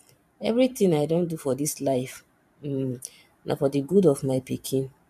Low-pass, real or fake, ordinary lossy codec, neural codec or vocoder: 14.4 kHz; fake; none; vocoder, 44.1 kHz, 128 mel bands every 512 samples, BigVGAN v2